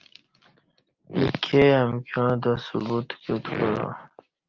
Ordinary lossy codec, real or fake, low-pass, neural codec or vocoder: Opus, 32 kbps; real; 7.2 kHz; none